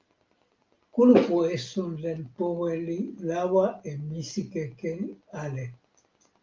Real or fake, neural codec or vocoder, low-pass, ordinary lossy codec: real; none; 7.2 kHz; Opus, 32 kbps